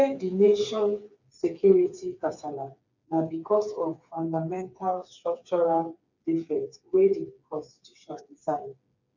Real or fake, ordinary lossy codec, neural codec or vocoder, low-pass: fake; none; codec, 16 kHz, 4 kbps, FreqCodec, smaller model; 7.2 kHz